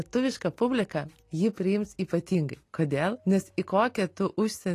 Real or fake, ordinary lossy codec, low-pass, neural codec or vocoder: real; AAC, 48 kbps; 14.4 kHz; none